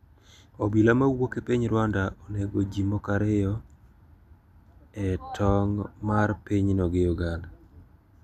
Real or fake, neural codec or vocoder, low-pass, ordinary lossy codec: real; none; 14.4 kHz; none